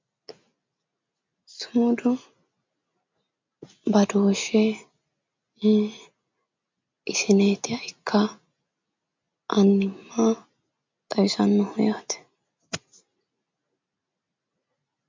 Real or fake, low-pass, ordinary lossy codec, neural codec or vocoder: real; 7.2 kHz; MP3, 64 kbps; none